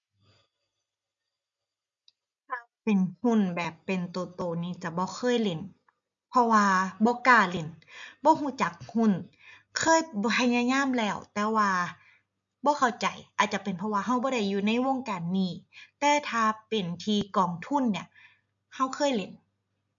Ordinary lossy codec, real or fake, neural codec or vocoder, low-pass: none; real; none; 7.2 kHz